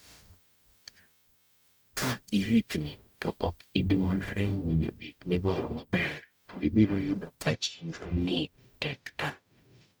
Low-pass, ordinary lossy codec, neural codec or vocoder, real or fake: none; none; codec, 44.1 kHz, 0.9 kbps, DAC; fake